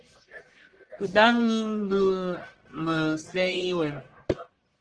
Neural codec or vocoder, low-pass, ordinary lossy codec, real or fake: codec, 44.1 kHz, 1.7 kbps, Pupu-Codec; 9.9 kHz; Opus, 16 kbps; fake